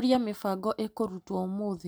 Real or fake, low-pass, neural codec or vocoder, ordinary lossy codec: real; none; none; none